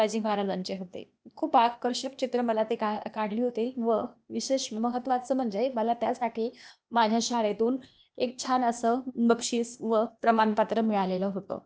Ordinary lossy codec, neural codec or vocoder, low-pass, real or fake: none; codec, 16 kHz, 0.8 kbps, ZipCodec; none; fake